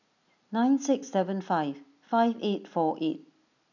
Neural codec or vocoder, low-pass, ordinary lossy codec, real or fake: none; 7.2 kHz; none; real